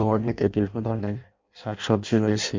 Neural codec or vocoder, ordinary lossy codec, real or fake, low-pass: codec, 16 kHz in and 24 kHz out, 0.6 kbps, FireRedTTS-2 codec; MP3, 48 kbps; fake; 7.2 kHz